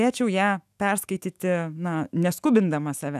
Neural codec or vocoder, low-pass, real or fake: autoencoder, 48 kHz, 128 numbers a frame, DAC-VAE, trained on Japanese speech; 14.4 kHz; fake